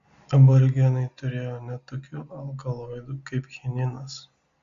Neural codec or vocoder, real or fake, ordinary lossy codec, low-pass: none; real; Opus, 64 kbps; 7.2 kHz